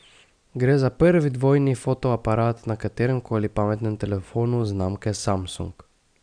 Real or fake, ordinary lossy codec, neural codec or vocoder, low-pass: real; none; none; 9.9 kHz